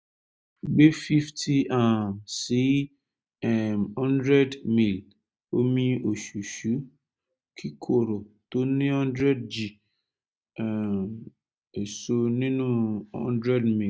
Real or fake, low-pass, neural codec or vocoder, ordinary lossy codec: real; none; none; none